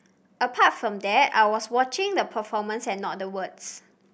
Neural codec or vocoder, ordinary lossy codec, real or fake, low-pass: none; none; real; none